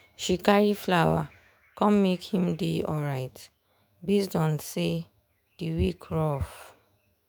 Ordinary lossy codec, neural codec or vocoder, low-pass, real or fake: none; autoencoder, 48 kHz, 128 numbers a frame, DAC-VAE, trained on Japanese speech; none; fake